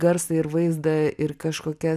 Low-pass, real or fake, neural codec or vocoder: 14.4 kHz; real; none